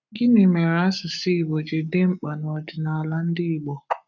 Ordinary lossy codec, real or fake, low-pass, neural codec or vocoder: none; fake; 7.2 kHz; codec, 44.1 kHz, 7.8 kbps, Pupu-Codec